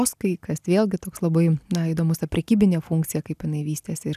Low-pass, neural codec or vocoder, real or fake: 14.4 kHz; none; real